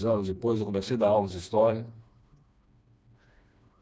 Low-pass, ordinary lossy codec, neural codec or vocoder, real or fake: none; none; codec, 16 kHz, 2 kbps, FreqCodec, smaller model; fake